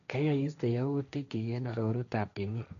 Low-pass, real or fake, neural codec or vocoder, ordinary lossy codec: 7.2 kHz; fake; codec, 16 kHz, 1.1 kbps, Voila-Tokenizer; none